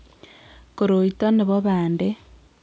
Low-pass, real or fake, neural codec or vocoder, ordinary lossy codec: none; real; none; none